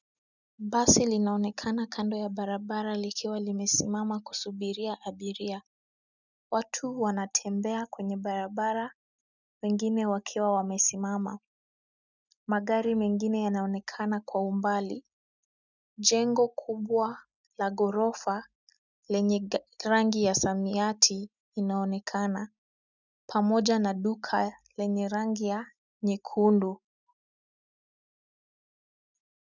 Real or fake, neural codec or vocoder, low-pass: real; none; 7.2 kHz